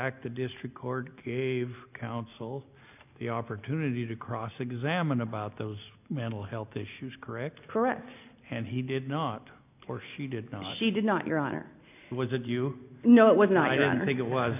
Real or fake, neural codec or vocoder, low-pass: real; none; 3.6 kHz